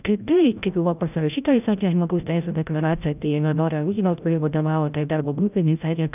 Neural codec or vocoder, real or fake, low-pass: codec, 16 kHz, 0.5 kbps, FreqCodec, larger model; fake; 3.6 kHz